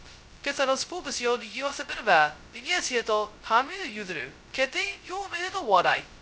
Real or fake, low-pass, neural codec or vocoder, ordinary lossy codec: fake; none; codec, 16 kHz, 0.2 kbps, FocalCodec; none